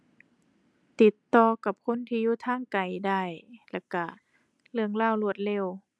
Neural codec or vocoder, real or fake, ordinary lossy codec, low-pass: none; real; none; none